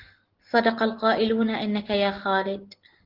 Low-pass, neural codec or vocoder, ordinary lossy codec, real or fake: 5.4 kHz; none; Opus, 16 kbps; real